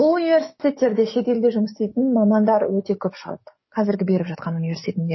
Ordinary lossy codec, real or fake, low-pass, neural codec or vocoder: MP3, 24 kbps; fake; 7.2 kHz; codec, 44.1 kHz, 7.8 kbps, DAC